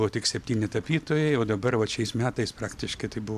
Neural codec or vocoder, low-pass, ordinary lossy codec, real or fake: none; 14.4 kHz; AAC, 96 kbps; real